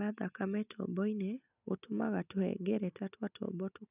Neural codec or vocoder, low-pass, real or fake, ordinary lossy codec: none; 3.6 kHz; real; none